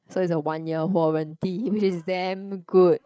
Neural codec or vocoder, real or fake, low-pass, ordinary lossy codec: codec, 16 kHz, 8 kbps, FreqCodec, larger model; fake; none; none